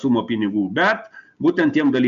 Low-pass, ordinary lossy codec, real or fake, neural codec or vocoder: 7.2 kHz; MP3, 64 kbps; real; none